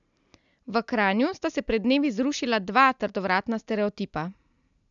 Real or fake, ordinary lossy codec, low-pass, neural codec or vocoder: real; none; 7.2 kHz; none